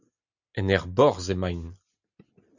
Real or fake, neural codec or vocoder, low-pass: real; none; 7.2 kHz